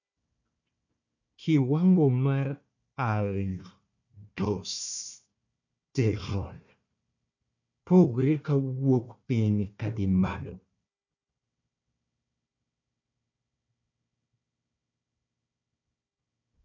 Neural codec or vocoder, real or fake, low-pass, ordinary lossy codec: codec, 16 kHz, 1 kbps, FunCodec, trained on Chinese and English, 50 frames a second; fake; 7.2 kHz; none